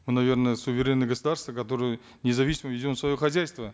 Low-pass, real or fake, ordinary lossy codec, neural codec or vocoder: none; real; none; none